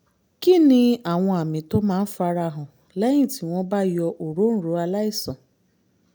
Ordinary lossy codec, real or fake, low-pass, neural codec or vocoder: none; real; none; none